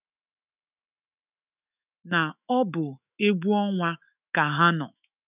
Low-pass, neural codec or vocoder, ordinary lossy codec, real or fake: 3.6 kHz; autoencoder, 48 kHz, 128 numbers a frame, DAC-VAE, trained on Japanese speech; none; fake